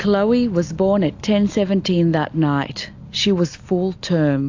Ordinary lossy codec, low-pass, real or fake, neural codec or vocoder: AAC, 48 kbps; 7.2 kHz; real; none